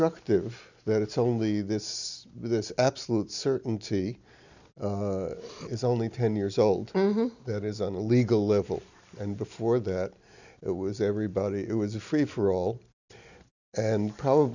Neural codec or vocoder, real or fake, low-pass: none; real; 7.2 kHz